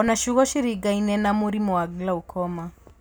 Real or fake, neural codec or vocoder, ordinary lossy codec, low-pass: real; none; none; none